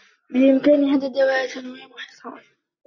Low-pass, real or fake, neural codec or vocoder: 7.2 kHz; real; none